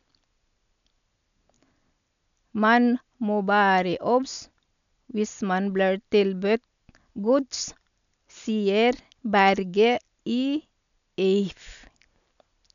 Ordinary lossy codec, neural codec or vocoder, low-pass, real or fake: none; none; 7.2 kHz; real